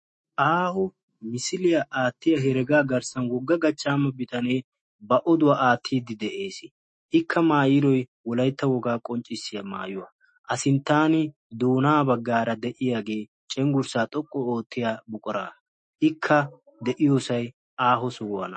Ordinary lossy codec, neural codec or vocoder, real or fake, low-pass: MP3, 32 kbps; autoencoder, 48 kHz, 128 numbers a frame, DAC-VAE, trained on Japanese speech; fake; 10.8 kHz